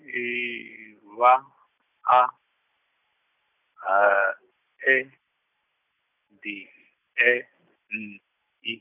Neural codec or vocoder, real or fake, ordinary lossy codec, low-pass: none; real; none; 3.6 kHz